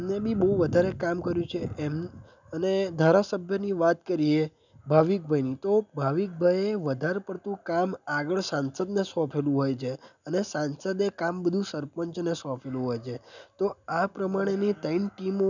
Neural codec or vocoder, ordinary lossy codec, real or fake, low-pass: none; none; real; 7.2 kHz